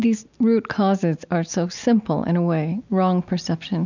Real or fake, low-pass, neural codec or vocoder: real; 7.2 kHz; none